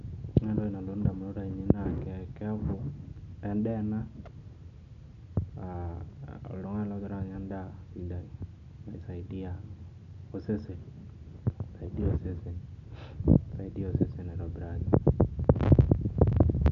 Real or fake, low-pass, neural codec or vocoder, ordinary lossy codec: real; 7.2 kHz; none; none